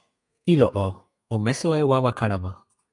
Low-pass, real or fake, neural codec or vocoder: 10.8 kHz; fake; codec, 32 kHz, 1.9 kbps, SNAC